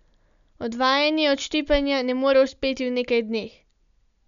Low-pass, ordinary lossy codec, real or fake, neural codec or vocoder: 7.2 kHz; none; real; none